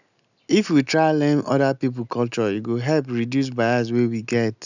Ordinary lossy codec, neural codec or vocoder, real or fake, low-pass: none; none; real; 7.2 kHz